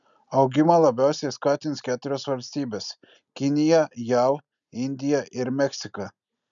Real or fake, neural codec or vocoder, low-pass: real; none; 7.2 kHz